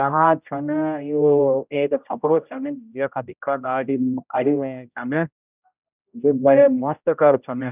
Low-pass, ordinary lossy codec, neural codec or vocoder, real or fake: 3.6 kHz; none; codec, 16 kHz, 0.5 kbps, X-Codec, HuBERT features, trained on general audio; fake